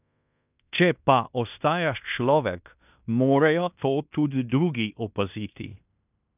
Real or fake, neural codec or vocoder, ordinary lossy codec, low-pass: fake; codec, 16 kHz in and 24 kHz out, 0.9 kbps, LongCat-Audio-Codec, fine tuned four codebook decoder; none; 3.6 kHz